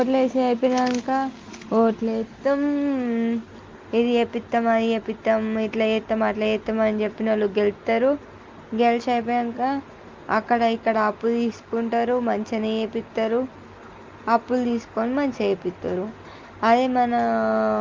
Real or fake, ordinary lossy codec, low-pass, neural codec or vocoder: real; Opus, 32 kbps; 7.2 kHz; none